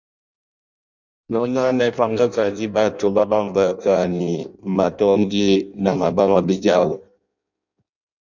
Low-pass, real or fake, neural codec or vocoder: 7.2 kHz; fake; codec, 16 kHz in and 24 kHz out, 0.6 kbps, FireRedTTS-2 codec